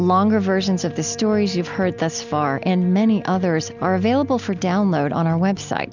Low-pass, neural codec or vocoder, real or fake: 7.2 kHz; none; real